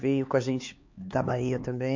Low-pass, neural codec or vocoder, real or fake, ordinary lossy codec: 7.2 kHz; codec, 16 kHz, 4 kbps, X-Codec, HuBERT features, trained on LibriSpeech; fake; MP3, 48 kbps